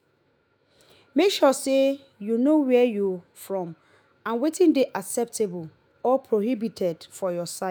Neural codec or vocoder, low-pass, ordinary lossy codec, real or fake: autoencoder, 48 kHz, 128 numbers a frame, DAC-VAE, trained on Japanese speech; none; none; fake